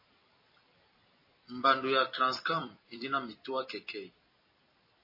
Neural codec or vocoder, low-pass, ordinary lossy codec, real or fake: vocoder, 44.1 kHz, 128 mel bands every 256 samples, BigVGAN v2; 5.4 kHz; MP3, 24 kbps; fake